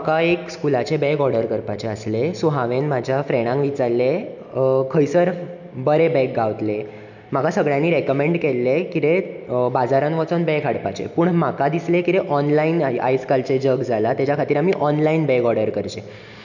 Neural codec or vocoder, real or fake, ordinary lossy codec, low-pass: none; real; none; 7.2 kHz